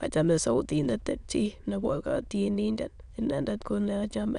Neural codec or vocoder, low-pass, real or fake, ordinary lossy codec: autoencoder, 22.05 kHz, a latent of 192 numbers a frame, VITS, trained on many speakers; 9.9 kHz; fake; none